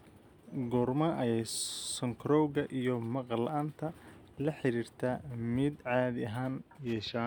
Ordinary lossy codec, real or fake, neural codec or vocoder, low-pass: none; real; none; none